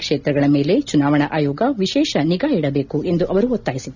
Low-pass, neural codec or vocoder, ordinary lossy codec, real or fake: 7.2 kHz; none; none; real